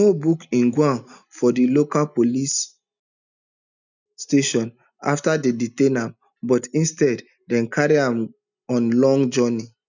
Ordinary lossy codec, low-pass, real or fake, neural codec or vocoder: none; 7.2 kHz; real; none